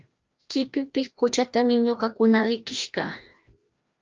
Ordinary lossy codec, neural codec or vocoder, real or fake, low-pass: Opus, 24 kbps; codec, 16 kHz, 1 kbps, FreqCodec, larger model; fake; 7.2 kHz